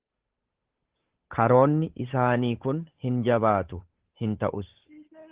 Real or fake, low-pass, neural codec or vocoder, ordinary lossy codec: fake; 3.6 kHz; codec, 16 kHz, 8 kbps, FunCodec, trained on Chinese and English, 25 frames a second; Opus, 16 kbps